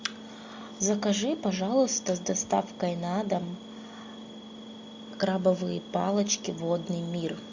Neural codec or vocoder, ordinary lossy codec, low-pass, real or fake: none; MP3, 64 kbps; 7.2 kHz; real